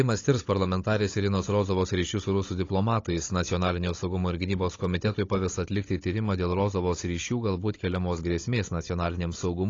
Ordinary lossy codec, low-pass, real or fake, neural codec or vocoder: AAC, 32 kbps; 7.2 kHz; fake; codec, 16 kHz, 16 kbps, FunCodec, trained on Chinese and English, 50 frames a second